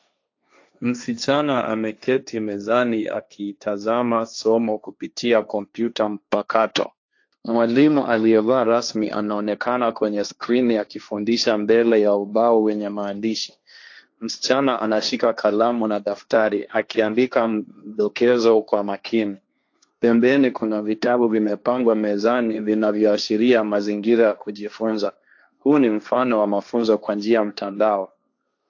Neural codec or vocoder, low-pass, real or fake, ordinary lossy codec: codec, 16 kHz, 1.1 kbps, Voila-Tokenizer; 7.2 kHz; fake; AAC, 48 kbps